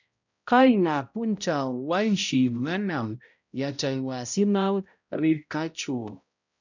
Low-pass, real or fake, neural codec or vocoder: 7.2 kHz; fake; codec, 16 kHz, 0.5 kbps, X-Codec, HuBERT features, trained on balanced general audio